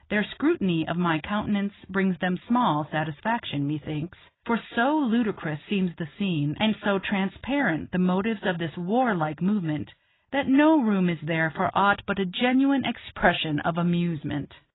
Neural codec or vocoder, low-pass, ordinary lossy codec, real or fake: none; 7.2 kHz; AAC, 16 kbps; real